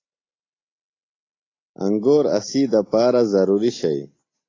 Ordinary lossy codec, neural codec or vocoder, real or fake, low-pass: AAC, 32 kbps; none; real; 7.2 kHz